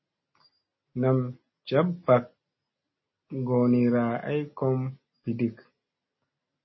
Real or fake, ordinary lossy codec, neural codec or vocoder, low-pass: real; MP3, 24 kbps; none; 7.2 kHz